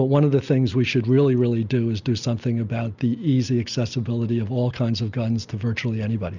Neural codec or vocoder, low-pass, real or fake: none; 7.2 kHz; real